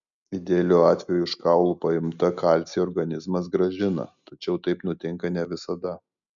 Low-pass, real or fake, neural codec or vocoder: 7.2 kHz; real; none